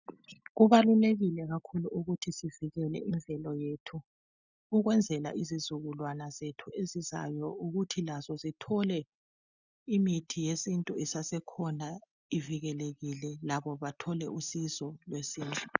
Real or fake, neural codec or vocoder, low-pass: real; none; 7.2 kHz